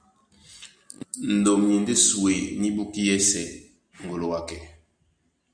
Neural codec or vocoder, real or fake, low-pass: none; real; 9.9 kHz